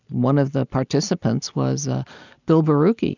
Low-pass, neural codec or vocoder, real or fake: 7.2 kHz; none; real